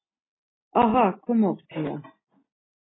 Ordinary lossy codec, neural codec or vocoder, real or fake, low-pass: AAC, 16 kbps; none; real; 7.2 kHz